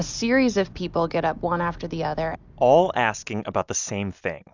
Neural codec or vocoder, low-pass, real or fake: none; 7.2 kHz; real